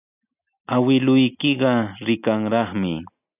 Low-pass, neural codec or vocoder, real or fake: 3.6 kHz; none; real